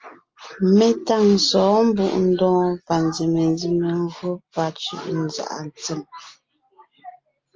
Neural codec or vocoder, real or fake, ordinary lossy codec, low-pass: none; real; Opus, 24 kbps; 7.2 kHz